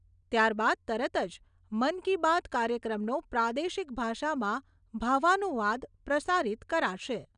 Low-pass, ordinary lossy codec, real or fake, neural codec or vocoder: 9.9 kHz; none; real; none